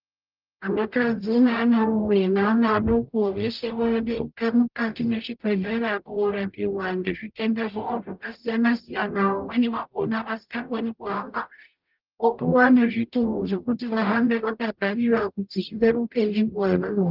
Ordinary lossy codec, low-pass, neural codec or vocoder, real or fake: Opus, 24 kbps; 5.4 kHz; codec, 44.1 kHz, 0.9 kbps, DAC; fake